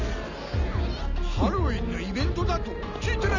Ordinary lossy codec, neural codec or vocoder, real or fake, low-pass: none; none; real; 7.2 kHz